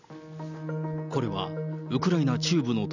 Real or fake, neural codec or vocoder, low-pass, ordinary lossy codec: real; none; 7.2 kHz; none